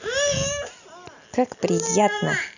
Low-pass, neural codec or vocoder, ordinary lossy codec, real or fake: 7.2 kHz; none; none; real